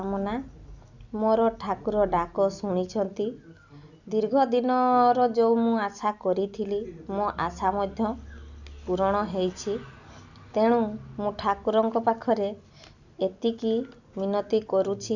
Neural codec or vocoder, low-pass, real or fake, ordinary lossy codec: none; 7.2 kHz; real; none